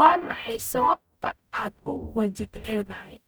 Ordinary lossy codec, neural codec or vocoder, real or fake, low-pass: none; codec, 44.1 kHz, 0.9 kbps, DAC; fake; none